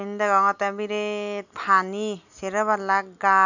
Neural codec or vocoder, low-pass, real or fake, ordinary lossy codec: none; 7.2 kHz; real; none